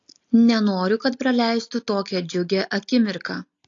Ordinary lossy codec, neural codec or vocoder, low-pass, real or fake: AAC, 48 kbps; none; 7.2 kHz; real